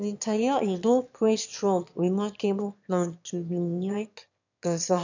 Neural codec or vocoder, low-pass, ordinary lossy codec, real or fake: autoencoder, 22.05 kHz, a latent of 192 numbers a frame, VITS, trained on one speaker; 7.2 kHz; none; fake